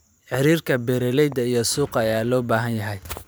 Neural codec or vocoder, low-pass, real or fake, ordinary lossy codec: vocoder, 44.1 kHz, 128 mel bands every 512 samples, BigVGAN v2; none; fake; none